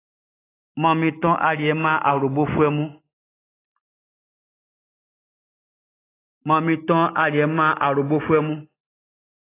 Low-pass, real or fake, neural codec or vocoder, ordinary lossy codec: 3.6 kHz; real; none; AAC, 16 kbps